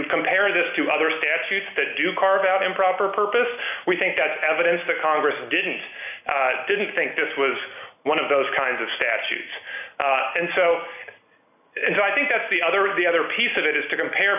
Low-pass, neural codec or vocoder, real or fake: 3.6 kHz; none; real